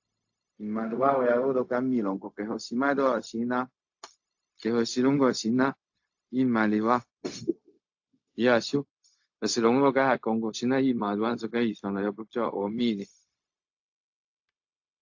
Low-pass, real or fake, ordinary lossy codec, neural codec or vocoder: 7.2 kHz; fake; AAC, 48 kbps; codec, 16 kHz, 0.4 kbps, LongCat-Audio-Codec